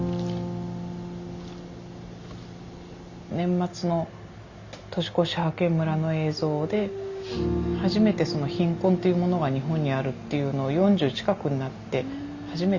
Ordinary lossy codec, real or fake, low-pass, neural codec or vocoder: Opus, 64 kbps; real; 7.2 kHz; none